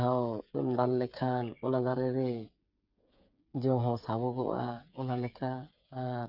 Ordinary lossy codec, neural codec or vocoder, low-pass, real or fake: none; codec, 16 kHz, 8 kbps, FreqCodec, smaller model; 5.4 kHz; fake